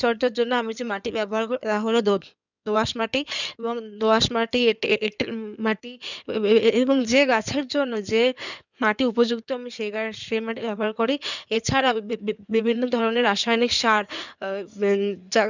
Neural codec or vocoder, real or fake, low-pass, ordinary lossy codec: codec, 16 kHz in and 24 kHz out, 2.2 kbps, FireRedTTS-2 codec; fake; 7.2 kHz; none